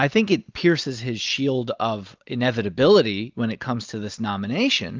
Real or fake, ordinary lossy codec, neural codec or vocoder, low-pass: real; Opus, 32 kbps; none; 7.2 kHz